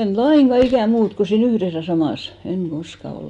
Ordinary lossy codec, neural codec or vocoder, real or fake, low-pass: none; none; real; 10.8 kHz